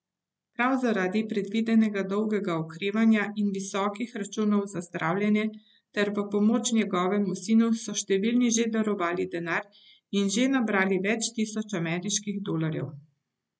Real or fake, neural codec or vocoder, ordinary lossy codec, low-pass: real; none; none; none